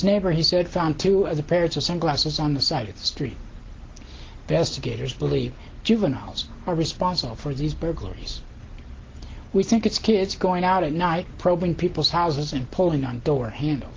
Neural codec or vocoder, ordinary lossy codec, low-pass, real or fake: none; Opus, 16 kbps; 7.2 kHz; real